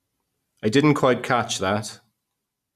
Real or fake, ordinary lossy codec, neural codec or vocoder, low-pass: real; none; none; 14.4 kHz